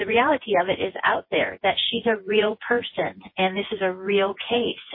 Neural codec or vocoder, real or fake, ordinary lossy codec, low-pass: vocoder, 24 kHz, 100 mel bands, Vocos; fake; MP3, 24 kbps; 5.4 kHz